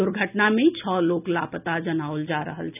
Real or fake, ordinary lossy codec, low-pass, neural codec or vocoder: real; none; 3.6 kHz; none